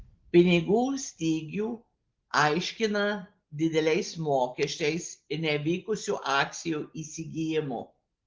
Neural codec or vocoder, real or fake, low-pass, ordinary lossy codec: none; real; 7.2 kHz; Opus, 16 kbps